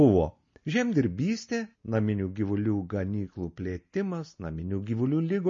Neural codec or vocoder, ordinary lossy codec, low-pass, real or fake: none; MP3, 32 kbps; 7.2 kHz; real